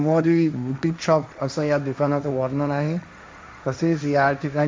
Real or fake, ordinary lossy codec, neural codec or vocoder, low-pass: fake; none; codec, 16 kHz, 1.1 kbps, Voila-Tokenizer; none